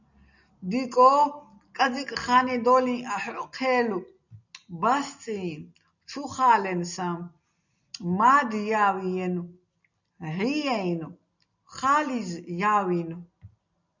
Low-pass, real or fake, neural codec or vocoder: 7.2 kHz; real; none